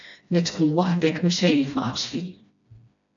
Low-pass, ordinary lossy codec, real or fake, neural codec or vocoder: 7.2 kHz; AAC, 64 kbps; fake; codec, 16 kHz, 1 kbps, FreqCodec, smaller model